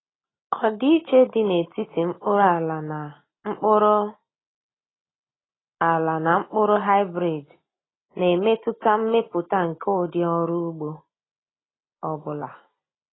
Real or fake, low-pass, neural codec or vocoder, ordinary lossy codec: real; 7.2 kHz; none; AAC, 16 kbps